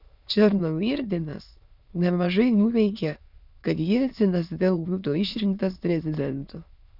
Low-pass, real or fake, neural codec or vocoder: 5.4 kHz; fake; autoencoder, 22.05 kHz, a latent of 192 numbers a frame, VITS, trained on many speakers